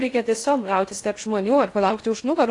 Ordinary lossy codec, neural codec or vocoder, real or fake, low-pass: AAC, 48 kbps; codec, 16 kHz in and 24 kHz out, 0.6 kbps, FocalCodec, streaming, 2048 codes; fake; 10.8 kHz